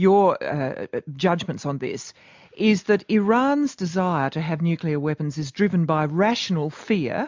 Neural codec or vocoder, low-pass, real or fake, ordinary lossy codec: none; 7.2 kHz; real; MP3, 64 kbps